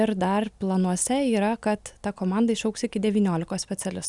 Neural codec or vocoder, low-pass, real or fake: none; 10.8 kHz; real